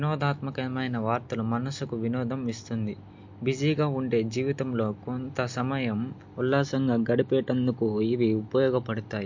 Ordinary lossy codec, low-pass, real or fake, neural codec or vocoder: MP3, 48 kbps; 7.2 kHz; real; none